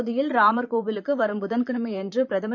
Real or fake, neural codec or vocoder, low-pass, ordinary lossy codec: fake; vocoder, 44.1 kHz, 128 mel bands, Pupu-Vocoder; 7.2 kHz; none